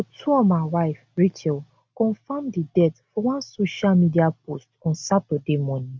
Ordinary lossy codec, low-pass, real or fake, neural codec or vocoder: none; none; real; none